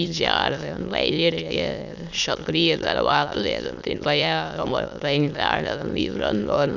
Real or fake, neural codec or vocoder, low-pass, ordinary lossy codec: fake; autoencoder, 22.05 kHz, a latent of 192 numbers a frame, VITS, trained on many speakers; 7.2 kHz; none